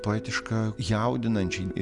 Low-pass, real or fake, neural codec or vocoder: 10.8 kHz; real; none